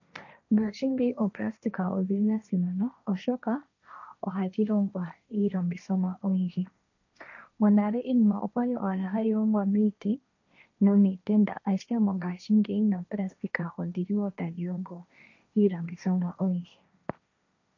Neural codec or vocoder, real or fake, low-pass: codec, 16 kHz, 1.1 kbps, Voila-Tokenizer; fake; 7.2 kHz